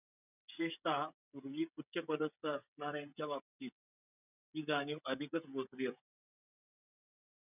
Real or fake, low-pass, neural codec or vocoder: fake; 3.6 kHz; codec, 16 kHz, 16 kbps, FreqCodec, smaller model